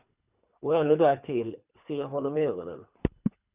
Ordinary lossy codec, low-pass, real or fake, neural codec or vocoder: Opus, 64 kbps; 3.6 kHz; fake; codec, 24 kHz, 3 kbps, HILCodec